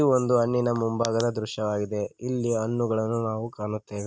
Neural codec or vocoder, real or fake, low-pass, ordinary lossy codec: none; real; none; none